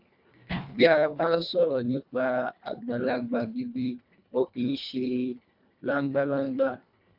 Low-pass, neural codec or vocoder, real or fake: 5.4 kHz; codec, 24 kHz, 1.5 kbps, HILCodec; fake